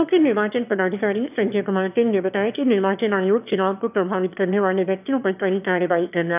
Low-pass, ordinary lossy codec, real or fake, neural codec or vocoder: 3.6 kHz; none; fake; autoencoder, 22.05 kHz, a latent of 192 numbers a frame, VITS, trained on one speaker